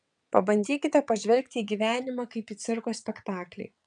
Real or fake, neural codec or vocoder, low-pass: fake; vocoder, 22.05 kHz, 80 mel bands, WaveNeXt; 9.9 kHz